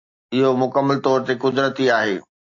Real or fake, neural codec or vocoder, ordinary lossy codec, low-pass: real; none; AAC, 48 kbps; 7.2 kHz